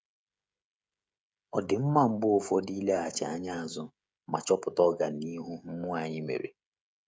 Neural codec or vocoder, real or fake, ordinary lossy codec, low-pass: codec, 16 kHz, 16 kbps, FreqCodec, smaller model; fake; none; none